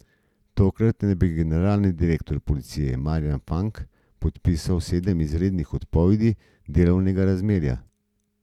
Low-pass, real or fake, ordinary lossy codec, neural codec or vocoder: 19.8 kHz; real; none; none